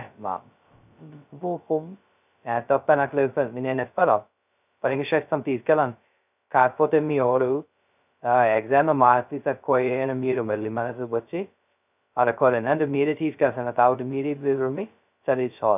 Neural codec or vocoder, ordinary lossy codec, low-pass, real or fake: codec, 16 kHz, 0.2 kbps, FocalCodec; none; 3.6 kHz; fake